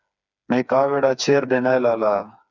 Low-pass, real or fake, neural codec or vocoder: 7.2 kHz; fake; codec, 16 kHz, 4 kbps, FreqCodec, smaller model